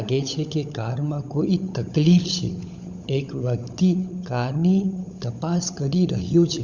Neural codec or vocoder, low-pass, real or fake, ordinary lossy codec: codec, 16 kHz, 16 kbps, FunCodec, trained on LibriTTS, 50 frames a second; 7.2 kHz; fake; none